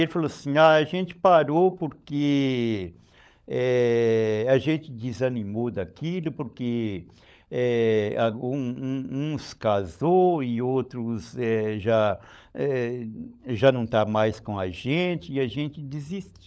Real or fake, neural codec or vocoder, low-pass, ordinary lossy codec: fake; codec, 16 kHz, 16 kbps, FunCodec, trained on LibriTTS, 50 frames a second; none; none